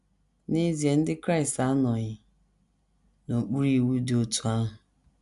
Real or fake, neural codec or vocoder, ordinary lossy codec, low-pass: real; none; none; 10.8 kHz